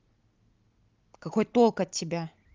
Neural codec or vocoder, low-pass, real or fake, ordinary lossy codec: none; 7.2 kHz; real; Opus, 32 kbps